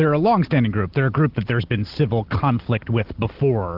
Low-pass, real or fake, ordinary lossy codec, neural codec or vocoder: 5.4 kHz; real; Opus, 16 kbps; none